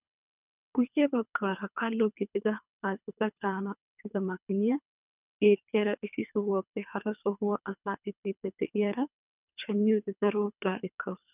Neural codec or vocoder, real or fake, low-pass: codec, 24 kHz, 3 kbps, HILCodec; fake; 3.6 kHz